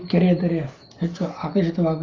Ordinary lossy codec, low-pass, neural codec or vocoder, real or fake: Opus, 32 kbps; 7.2 kHz; none; real